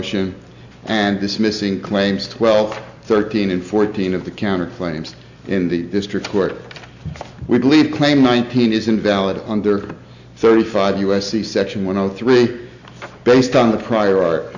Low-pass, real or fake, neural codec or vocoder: 7.2 kHz; real; none